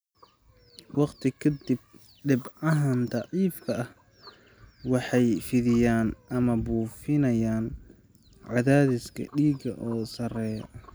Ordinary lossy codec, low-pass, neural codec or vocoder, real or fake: none; none; none; real